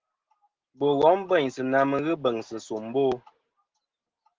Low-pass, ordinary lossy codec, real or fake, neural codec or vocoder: 7.2 kHz; Opus, 16 kbps; real; none